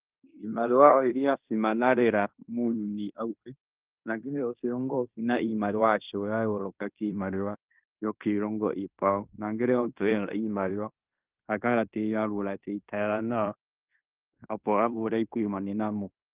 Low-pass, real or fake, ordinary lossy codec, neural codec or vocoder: 3.6 kHz; fake; Opus, 32 kbps; codec, 16 kHz in and 24 kHz out, 0.9 kbps, LongCat-Audio-Codec, fine tuned four codebook decoder